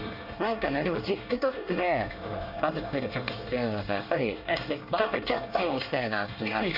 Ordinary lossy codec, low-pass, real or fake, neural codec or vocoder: none; 5.4 kHz; fake; codec, 24 kHz, 1 kbps, SNAC